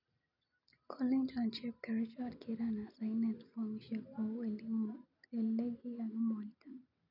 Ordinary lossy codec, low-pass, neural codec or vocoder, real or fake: none; 5.4 kHz; none; real